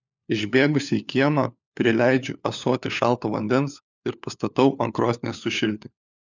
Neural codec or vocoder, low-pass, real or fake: codec, 16 kHz, 4 kbps, FunCodec, trained on LibriTTS, 50 frames a second; 7.2 kHz; fake